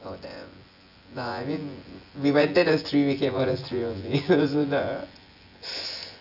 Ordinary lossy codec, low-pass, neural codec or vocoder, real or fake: AAC, 48 kbps; 5.4 kHz; vocoder, 24 kHz, 100 mel bands, Vocos; fake